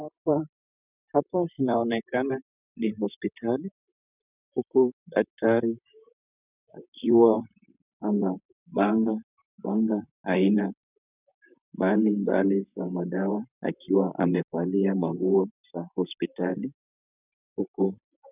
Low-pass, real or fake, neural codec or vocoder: 3.6 kHz; fake; vocoder, 44.1 kHz, 128 mel bands, Pupu-Vocoder